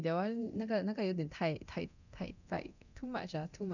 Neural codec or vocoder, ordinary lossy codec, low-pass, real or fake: codec, 24 kHz, 0.9 kbps, DualCodec; none; 7.2 kHz; fake